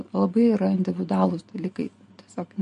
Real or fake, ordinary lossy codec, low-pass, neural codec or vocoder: real; MP3, 48 kbps; 10.8 kHz; none